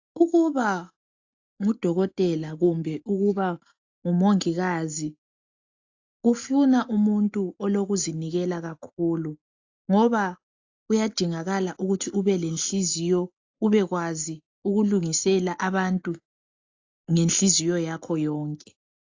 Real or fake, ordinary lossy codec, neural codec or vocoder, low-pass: real; AAC, 48 kbps; none; 7.2 kHz